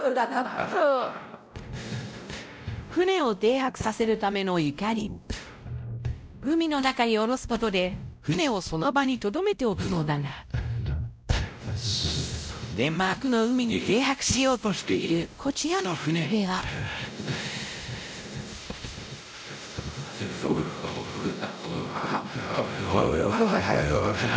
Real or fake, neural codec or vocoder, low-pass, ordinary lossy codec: fake; codec, 16 kHz, 0.5 kbps, X-Codec, WavLM features, trained on Multilingual LibriSpeech; none; none